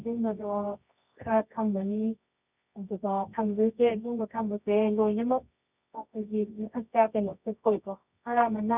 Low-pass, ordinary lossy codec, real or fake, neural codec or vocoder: 3.6 kHz; none; fake; codec, 24 kHz, 0.9 kbps, WavTokenizer, medium music audio release